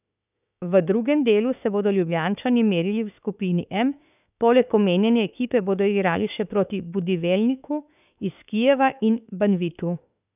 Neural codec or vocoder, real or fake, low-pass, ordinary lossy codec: autoencoder, 48 kHz, 32 numbers a frame, DAC-VAE, trained on Japanese speech; fake; 3.6 kHz; none